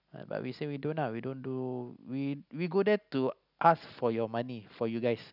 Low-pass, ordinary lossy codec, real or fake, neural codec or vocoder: 5.4 kHz; none; real; none